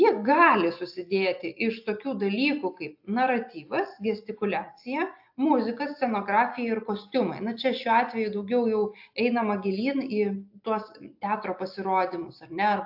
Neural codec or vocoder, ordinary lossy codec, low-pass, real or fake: none; AAC, 48 kbps; 5.4 kHz; real